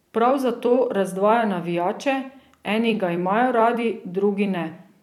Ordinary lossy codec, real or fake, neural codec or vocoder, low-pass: none; fake; vocoder, 44.1 kHz, 128 mel bands every 256 samples, BigVGAN v2; 19.8 kHz